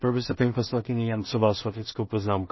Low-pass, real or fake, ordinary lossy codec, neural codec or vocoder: 7.2 kHz; fake; MP3, 24 kbps; codec, 16 kHz in and 24 kHz out, 0.4 kbps, LongCat-Audio-Codec, two codebook decoder